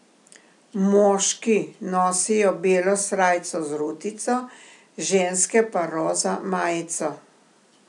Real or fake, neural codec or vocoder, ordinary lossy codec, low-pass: real; none; none; 10.8 kHz